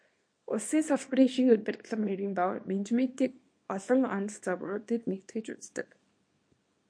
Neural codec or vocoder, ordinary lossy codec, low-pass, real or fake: codec, 24 kHz, 0.9 kbps, WavTokenizer, small release; MP3, 48 kbps; 9.9 kHz; fake